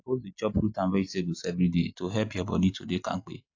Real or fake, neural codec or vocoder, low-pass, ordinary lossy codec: real; none; 7.2 kHz; AAC, 48 kbps